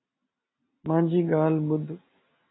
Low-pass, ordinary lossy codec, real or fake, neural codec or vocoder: 7.2 kHz; AAC, 16 kbps; real; none